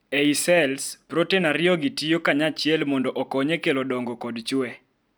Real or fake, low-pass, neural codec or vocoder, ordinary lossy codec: real; none; none; none